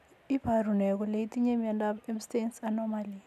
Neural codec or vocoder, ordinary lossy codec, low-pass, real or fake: none; none; 14.4 kHz; real